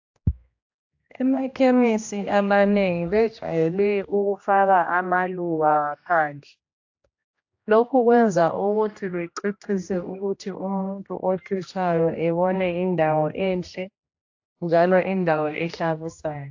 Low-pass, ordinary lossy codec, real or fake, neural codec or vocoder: 7.2 kHz; AAC, 48 kbps; fake; codec, 16 kHz, 1 kbps, X-Codec, HuBERT features, trained on general audio